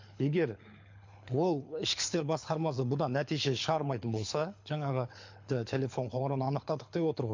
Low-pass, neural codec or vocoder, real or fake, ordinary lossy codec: 7.2 kHz; codec, 24 kHz, 6 kbps, HILCodec; fake; MP3, 48 kbps